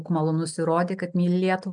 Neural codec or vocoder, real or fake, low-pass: none; real; 9.9 kHz